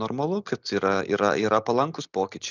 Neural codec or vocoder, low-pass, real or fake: none; 7.2 kHz; real